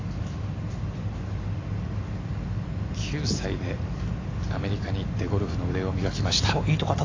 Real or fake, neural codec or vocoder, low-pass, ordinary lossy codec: real; none; 7.2 kHz; AAC, 32 kbps